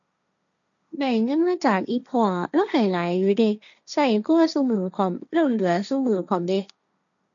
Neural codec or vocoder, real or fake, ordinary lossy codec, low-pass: codec, 16 kHz, 1.1 kbps, Voila-Tokenizer; fake; none; 7.2 kHz